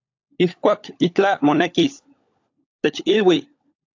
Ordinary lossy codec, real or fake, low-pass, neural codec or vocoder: AAC, 48 kbps; fake; 7.2 kHz; codec, 16 kHz, 16 kbps, FunCodec, trained on LibriTTS, 50 frames a second